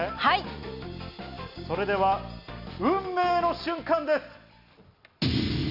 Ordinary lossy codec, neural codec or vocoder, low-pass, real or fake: none; none; 5.4 kHz; real